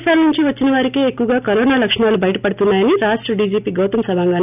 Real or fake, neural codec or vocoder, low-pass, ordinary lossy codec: real; none; 3.6 kHz; none